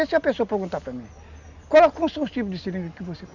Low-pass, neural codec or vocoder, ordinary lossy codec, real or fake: 7.2 kHz; none; none; real